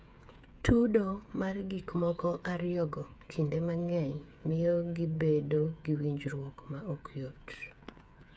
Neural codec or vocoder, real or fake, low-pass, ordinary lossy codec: codec, 16 kHz, 8 kbps, FreqCodec, smaller model; fake; none; none